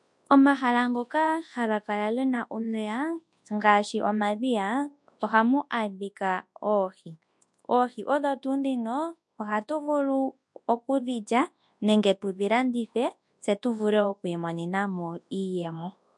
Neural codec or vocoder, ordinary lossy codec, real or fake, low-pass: codec, 24 kHz, 0.9 kbps, WavTokenizer, large speech release; MP3, 64 kbps; fake; 10.8 kHz